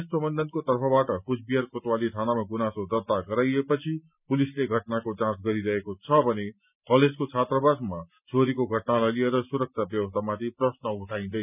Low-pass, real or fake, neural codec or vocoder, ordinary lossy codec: 3.6 kHz; real; none; none